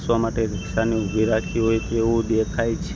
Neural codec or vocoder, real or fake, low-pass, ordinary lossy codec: none; real; none; none